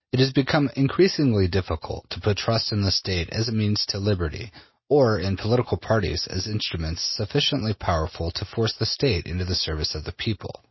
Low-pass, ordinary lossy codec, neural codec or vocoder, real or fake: 7.2 kHz; MP3, 24 kbps; none; real